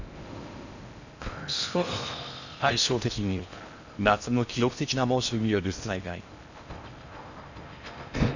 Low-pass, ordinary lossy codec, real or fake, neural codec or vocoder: 7.2 kHz; none; fake; codec, 16 kHz in and 24 kHz out, 0.6 kbps, FocalCodec, streaming, 4096 codes